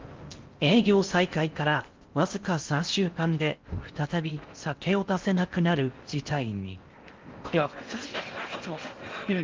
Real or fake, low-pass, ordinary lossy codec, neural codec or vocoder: fake; 7.2 kHz; Opus, 32 kbps; codec, 16 kHz in and 24 kHz out, 0.6 kbps, FocalCodec, streaming, 4096 codes